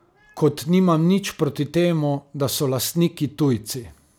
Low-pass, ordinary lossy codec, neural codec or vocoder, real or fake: none; none; none; real